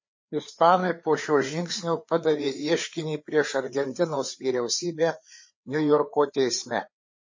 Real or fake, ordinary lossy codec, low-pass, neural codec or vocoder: fake; MP3, 32 kbps; 7.2 kHz; codec, 16 kHz, 4 kbps, FreqCodec, larger model